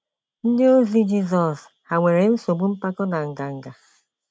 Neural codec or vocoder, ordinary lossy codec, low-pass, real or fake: none; none; none; real